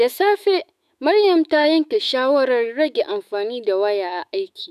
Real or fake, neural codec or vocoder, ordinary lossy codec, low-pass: fake; autoencoder, 48 kHz, 128 numbers a frame, DAC-VAE, trained on Japanese speech; none; 14.4 kHz